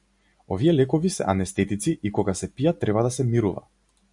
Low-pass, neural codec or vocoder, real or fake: 10.8 kHz; none; real